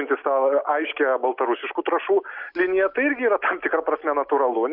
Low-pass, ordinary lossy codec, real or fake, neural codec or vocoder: 5.4 kHz; Opus, 64 kbps; real; none